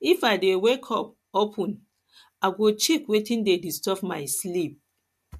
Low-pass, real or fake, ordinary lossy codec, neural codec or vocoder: 14.4 kHz; real; MP3, 64 kbps; none